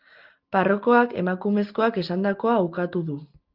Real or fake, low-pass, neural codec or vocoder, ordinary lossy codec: real; 5.4 kHz; none; Opus, 32 kbps